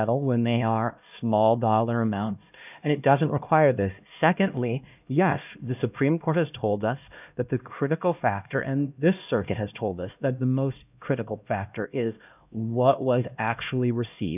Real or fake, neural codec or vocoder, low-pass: fake; codec, 16 kHz, 1 kbps, X-Codec, HuBERT features, trained on LibriSpeech; 3.6 kHz